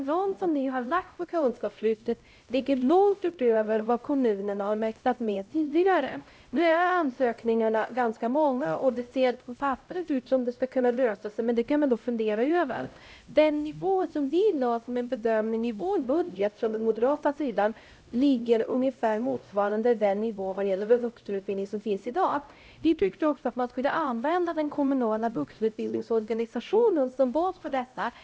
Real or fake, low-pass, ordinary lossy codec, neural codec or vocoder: fake; none; none; codec, 16 kHz, 0.5 kbps, X-Codec, HuBERT features, trained on LibriSpeech